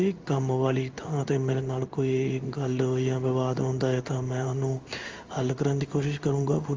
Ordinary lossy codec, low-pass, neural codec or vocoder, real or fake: Opus, 32 kbps; 7.2 kHz; codec, 16 kHz in and 24 kHz out, 1 kbps, XY-Tokenizer; fake